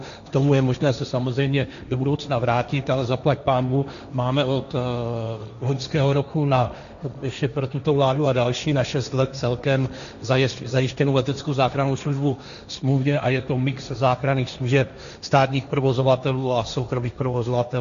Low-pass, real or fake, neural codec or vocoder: 7.2 kHz; fake; codec, 16 kHz, 1.1 kbps, Voila-Tokenizer